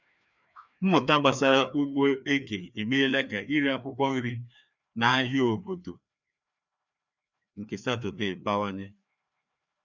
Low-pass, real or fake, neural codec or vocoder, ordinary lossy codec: 7.2 kHz; fake; codec, 16 kHz, 2 kbps, FreqCodec, larger model; none